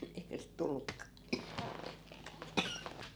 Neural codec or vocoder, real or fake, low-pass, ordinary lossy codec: none; real; none; none